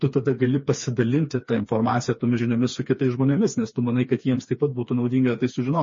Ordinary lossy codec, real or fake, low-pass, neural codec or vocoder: MP3, 32 kbps; fake; 7.2 kHz; codec, 16 kHz, 4 kbps, FreqCodec, smaller model